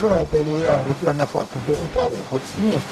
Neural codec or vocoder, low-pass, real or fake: codec, 44.1 kHz, 0.9 kbps, DAC; 14.4 kHz; fake